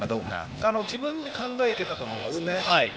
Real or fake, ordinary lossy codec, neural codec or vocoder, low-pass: fake; none; codec, 16 kHz, 0.8 kbps, ZipCodec; none